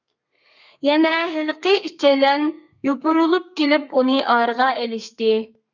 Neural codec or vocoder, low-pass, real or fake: codec, 32 kHz, 1.9 kbps, SNAC; 7.2 kHz; fake